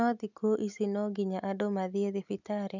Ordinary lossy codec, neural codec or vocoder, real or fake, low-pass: none; none; real; 7.2 kHz